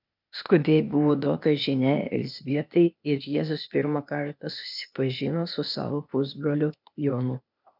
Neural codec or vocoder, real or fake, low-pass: codec, 16 kHz, 0.8 kbps, ZipCodec; fake; 5.4 kHz